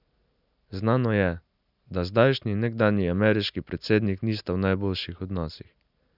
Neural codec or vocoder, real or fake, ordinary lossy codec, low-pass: none; real; none; 5.4 kHz